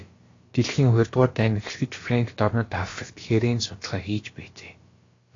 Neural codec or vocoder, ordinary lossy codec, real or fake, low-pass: codec, 16 kHz, about 1 kbps, DyCAST, with the encoder's durations; AAC, 32 kbps; fake; 7.2 kHz